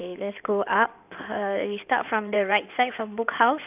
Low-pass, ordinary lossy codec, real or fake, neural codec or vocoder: 3.6 kHz; none; fake; codec, 16 kHz in and 24 kHz out, 2.2 kbps, FireRedTTS-2 codec